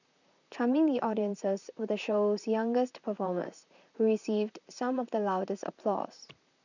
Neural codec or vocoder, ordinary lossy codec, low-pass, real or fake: vocoder, 44.1 kHz, 128 mel bands, Pupu-Vocoder; none; 7.2 kHz; fake